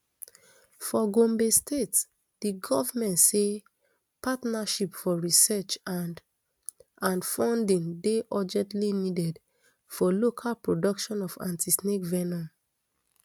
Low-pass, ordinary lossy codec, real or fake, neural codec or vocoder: none; none; real; none